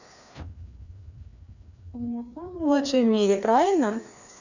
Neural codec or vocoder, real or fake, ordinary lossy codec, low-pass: codec, 16 kHz, 2 kbps, FreqCodec, larger model; fake; none; 7.2 kHz